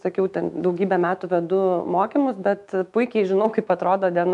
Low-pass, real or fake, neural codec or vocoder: 10.8 kHz; fake; autoencoder, 48 kHz, 128 numbers a frame, DAC-VAE, trained on Japanese speech